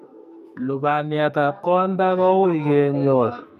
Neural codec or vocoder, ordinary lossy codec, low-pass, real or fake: codec, 44.1 kHz, 2.6 kbps, SNAC; none; 14.4 kHz; fake